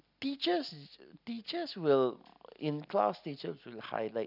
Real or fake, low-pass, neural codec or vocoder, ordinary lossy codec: real; 5.4 kHz; none; none